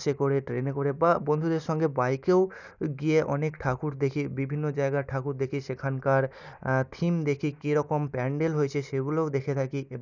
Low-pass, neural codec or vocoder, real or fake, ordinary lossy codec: 7.2 kHz; none; real; none